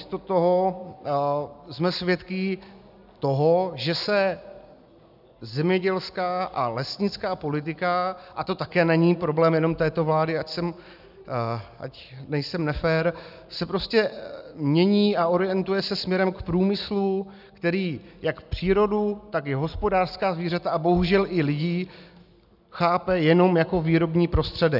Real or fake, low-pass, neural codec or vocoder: real; 5.4 kHz; none